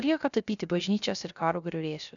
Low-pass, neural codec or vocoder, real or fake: 7.2 kHz; codec, 16 kHz, 0.3 kbps, FocalCodec; fake